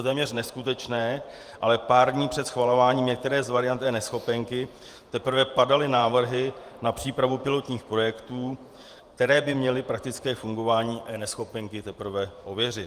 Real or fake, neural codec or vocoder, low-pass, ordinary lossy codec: fake; vocoder, 48 kHz, 128 mel bands, Vocos; 14.4 kHz; Opus, 32 kbps